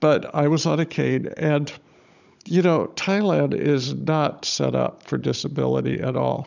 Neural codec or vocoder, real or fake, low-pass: codec, 16 kHz, 16 kbps, FunCodec, trained on Chinese and English, 50 frames a second; fake; 7.2 kHz